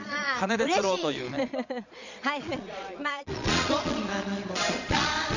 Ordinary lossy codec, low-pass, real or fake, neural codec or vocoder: none; 7.2 kHz; fake; vocoder, 22.05 kHz, 80 mel bands, Vocos